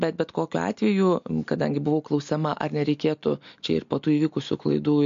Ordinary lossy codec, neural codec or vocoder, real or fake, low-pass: MP3, 48 kbps; none; real; 7.2 kHz